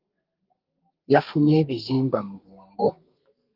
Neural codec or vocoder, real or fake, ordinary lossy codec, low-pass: codec, 32 kHz, 1.9 kbps, SNAC; fake; Opus, 24 kbps; 5.4 kHz